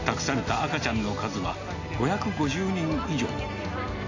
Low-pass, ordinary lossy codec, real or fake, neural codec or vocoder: 7.2 kHz; AAC, 48 kbps; real; none